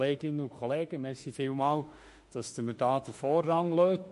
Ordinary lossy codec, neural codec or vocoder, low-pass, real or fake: MP3, 48 kbps; autoencoder, 48 kHz, 32 numbers a frame, DAC-VAE, trained on Japanese speech; 14.4 kHz; fake